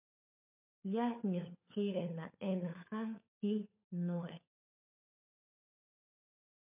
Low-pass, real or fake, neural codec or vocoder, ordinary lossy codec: 3.6 kHz; fake; codec, 16 kHz, 4 kbps, FunCodec, trained on LibriTTS, 50 frames a second; MP3, 32 kbps